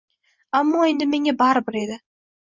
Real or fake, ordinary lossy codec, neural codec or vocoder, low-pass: real; Opus, 64 kbps; none; 7.2 kHz